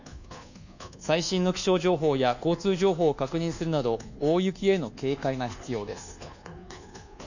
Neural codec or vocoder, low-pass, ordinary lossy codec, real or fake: codec, 24 kHz, 1.2 kbps, DualCodec; 7.2 kHz; none; fake